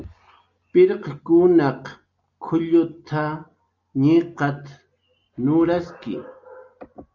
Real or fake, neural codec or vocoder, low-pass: real; none; 7.2 kHz